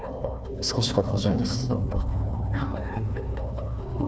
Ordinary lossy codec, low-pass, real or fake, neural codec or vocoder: none; none; fake; codec, 16 kHz, 1 kbps, FunCodec, trained on Chinese and English, 50 frames a second